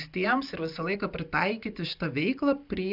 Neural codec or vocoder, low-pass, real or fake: none; 5.4 kHz; real